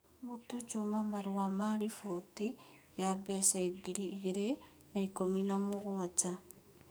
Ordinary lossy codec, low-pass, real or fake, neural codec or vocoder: none; none; fake; codec, 44.1 kHz, 2.6 kbps, SNAC